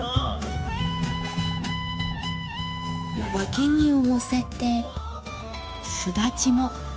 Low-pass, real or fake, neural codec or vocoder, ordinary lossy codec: none; fake; codec, 16 kHz, 0.9 kbps, LongCat-Audio-Codec; none